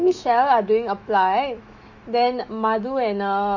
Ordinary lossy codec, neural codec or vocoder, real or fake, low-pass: none; autoencoder, 48 kHz, 128 numbers a frame, DAC-VAE, trained on Japanese speech; fake; 7.2 kHz